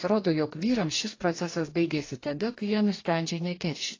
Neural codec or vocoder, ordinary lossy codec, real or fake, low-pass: codec, 44.1 kHz, 2.6 kbps, DAC; AAC, 32 kbps; fake; 7.2 kHz